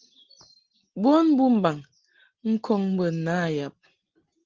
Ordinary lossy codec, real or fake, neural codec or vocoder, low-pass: Opus, 16 kbps; real; none; 7.2 kHz